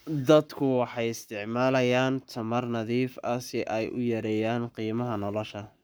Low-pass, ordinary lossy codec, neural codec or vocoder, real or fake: none; none; codec, 44.1 kHz, 7.8 kbps, Pupu-Codec; fake